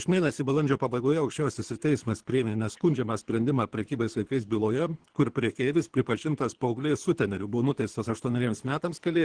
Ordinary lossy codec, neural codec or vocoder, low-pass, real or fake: Opus, 16 kbps; codec, 24 kHz, 3 kbps, HILCodec; 9.9 kHz; fake